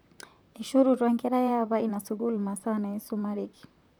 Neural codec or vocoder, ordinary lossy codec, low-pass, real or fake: vocoder, 44.1 kHz, 128 mel bands, Pupu-Vocoder; none; none; fake